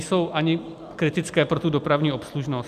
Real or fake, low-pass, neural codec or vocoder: real; 14.4 kHz; none